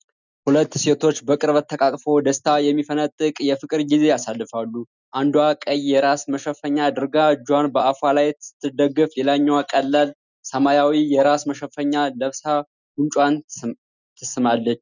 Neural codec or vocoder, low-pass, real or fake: none; 7.2 kHz; real